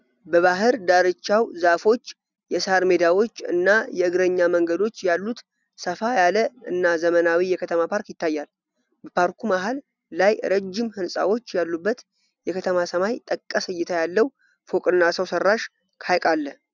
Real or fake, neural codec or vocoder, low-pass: real; none; 7.2 kHz